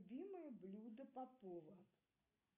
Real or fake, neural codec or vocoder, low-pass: real; none; 3.6 kHz